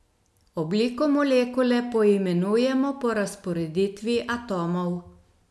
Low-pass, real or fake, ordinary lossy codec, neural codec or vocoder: none; real; none; none